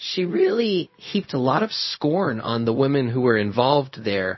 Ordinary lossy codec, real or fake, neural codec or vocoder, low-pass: MP3, 24 kbps; fake; codec, 16 kHz, 0.4 kbps, LongCat-Audio-Codec; 7.2 kHz